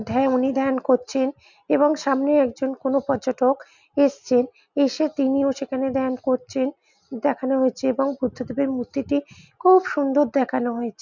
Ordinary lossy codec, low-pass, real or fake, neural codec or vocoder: none; 7.2 kHz; real; none